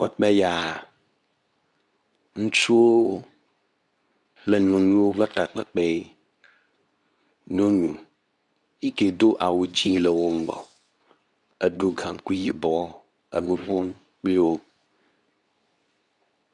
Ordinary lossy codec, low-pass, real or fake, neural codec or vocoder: MP3, 96 kbps; 10.8 kHz; fake; codec, 24 kHz, 0.9 kbps, WavTokenizer, medium speech release version 2